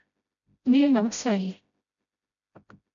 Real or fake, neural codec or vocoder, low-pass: fake; codec, 16 kHz, 0.5 kbps, FreqCodec, smaller model; 7.2 kHz